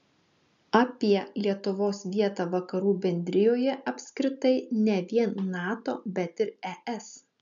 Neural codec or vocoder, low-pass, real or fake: none; 7.2 kHz; real